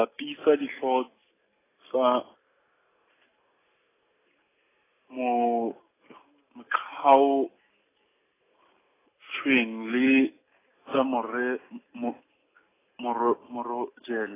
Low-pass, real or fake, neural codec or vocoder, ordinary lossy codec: 3.6 kHz; real; none; AAC, 16 kbps